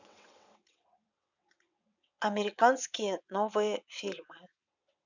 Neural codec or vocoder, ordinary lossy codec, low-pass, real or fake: vocoder, 22.05 kHz, 80 mel bands, WaveNeXt; none; 7.2 kHz; fake